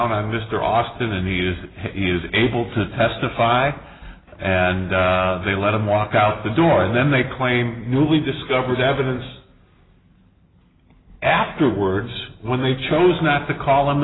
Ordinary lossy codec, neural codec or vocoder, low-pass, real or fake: AAC, 16 kbps; none; 7.2 kHz; real